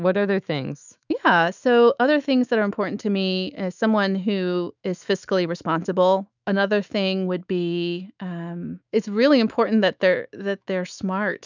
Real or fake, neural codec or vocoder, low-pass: fake; autoencoder, 48 kHz, 128 numbers a frame, DAC-VAE, trained on Japanese speech; 7.2 kHz